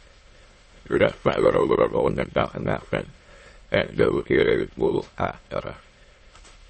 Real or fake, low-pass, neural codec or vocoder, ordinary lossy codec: fake; 9.9 kHz; autoencoder, 22.05 kHz, a latent of 192 numbers a frame, VITS, trained on many speakers; MP3, 32 kbps